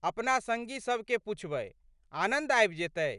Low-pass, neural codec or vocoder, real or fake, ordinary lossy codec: 10.8 kHz; none; real; none